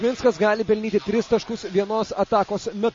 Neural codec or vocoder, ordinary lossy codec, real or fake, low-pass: none; MP3, 32 kbps; real; 7.2 kHz